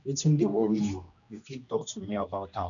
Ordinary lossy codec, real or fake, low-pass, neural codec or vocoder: none; fake; 7.2 kHz; codec, 16 kHz, 1 kbps, X-Codec, HuBERT features, trained on general audio